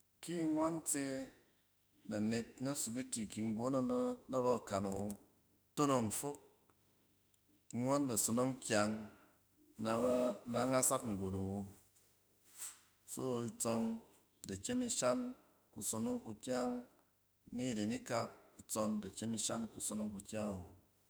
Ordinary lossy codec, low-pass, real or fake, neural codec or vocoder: none; none; fake; autoencoder, 48 kHz, 32 numbers a frame, DAC-VAE, trained on Japanese speech